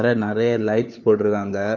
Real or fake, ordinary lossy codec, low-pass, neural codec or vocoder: fake; none; 7.2 kHz; codec, 16 kHz, 4 kbps, FunCodec, trained on LibriTTS, 50 frames a second